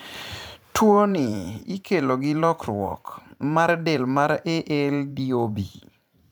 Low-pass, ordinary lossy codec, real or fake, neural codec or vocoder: none; none; fake; vocoder, 44.1 kHz, 128 mel bands every 512 samples, BigVGAN v2